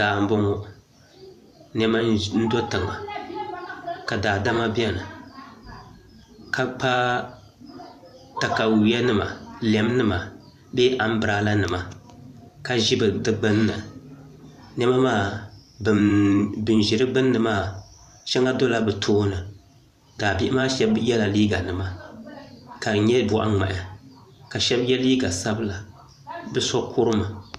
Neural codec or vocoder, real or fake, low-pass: vocoder, 44.1 kHz, 128 mel bands every 512 samples, BigVGAN v2; fake; 14.4 kHz